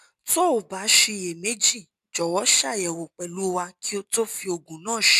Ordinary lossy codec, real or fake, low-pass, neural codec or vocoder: none; real; 14.4 kHz; none